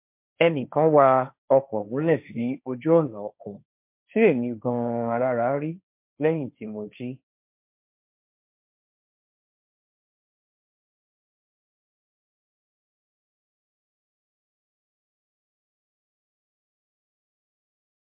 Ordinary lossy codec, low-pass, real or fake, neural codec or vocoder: MP3, 32 kbps; 3.6 kHz; fake; codec, 16 kHz, 1.1 kbps, Voila-Tokenizer